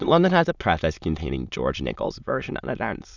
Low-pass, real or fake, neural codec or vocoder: 7.2 kHz; fake; autoencoder, 22.05 kHz, a latent of 192 numbers a frame, VITS, trained on many speakers